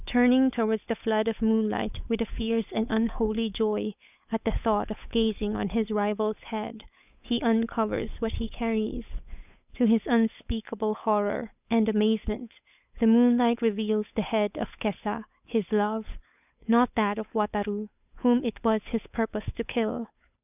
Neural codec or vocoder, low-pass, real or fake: codec, 16 kHz, 8 kbps, FunCodec, trained on Chinese and English, 25 frames a second; 3.6 kHz; fake